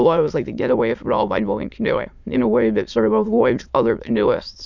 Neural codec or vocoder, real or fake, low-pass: autoencoder, 22.05 kHz, a latent of 192 numbers a frame, VITS, trained on many speakers; fake; 7.2 kHz